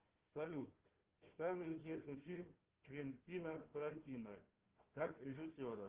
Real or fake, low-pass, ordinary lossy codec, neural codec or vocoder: fake; 3.6 kHz; Opus, 16 kbps; codec, 16 kHz, 1 kbps, FunCodec, trained on Chinese and English, 50 frames a second